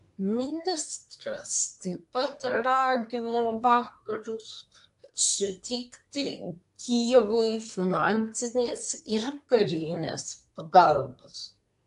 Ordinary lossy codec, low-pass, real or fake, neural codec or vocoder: AAC, 64 kbps; 10.8 kHz; fake; codec, 24 kHz, 1 kbps, SNAC